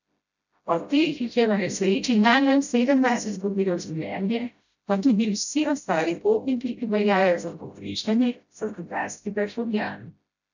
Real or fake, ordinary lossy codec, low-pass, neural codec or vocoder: fake; none; 7.2 kHz; codec, 16 kHz, 0.5 kbps, FreqCodec, smaller model